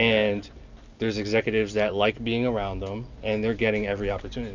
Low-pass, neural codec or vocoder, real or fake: 7.2 kHz; none; real